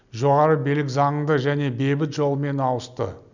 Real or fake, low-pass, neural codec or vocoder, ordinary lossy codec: real; 7.2 kHz; none; none